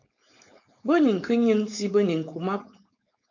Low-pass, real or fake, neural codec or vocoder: 7.2 kHz; fake; codec, 16 kHz, 4.8 kbps, FACodec